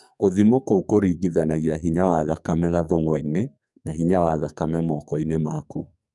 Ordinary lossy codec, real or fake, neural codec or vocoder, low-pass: none; fake; codec, 44.1 kHz, 2.6 kbps, SNAC; 10.8 kHz